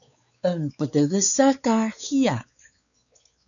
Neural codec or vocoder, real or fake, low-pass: codec, 16 kHz, 4 kbps, X-Codec, WavLM features, trained on Multilingual LibriSpeech; fake; 7.2 kHz